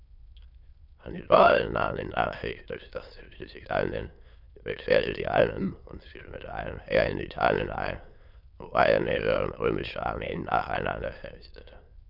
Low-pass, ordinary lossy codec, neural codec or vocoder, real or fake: 5.4 kHz; MP3, 48 kbps; autoencoder, 22.05 kHz, a latent of 192 numbers a frame, VITS, trained on many speakers; fake